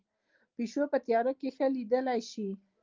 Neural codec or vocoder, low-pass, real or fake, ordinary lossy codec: none; 7.2 kHz; real; Opus, 32 kbps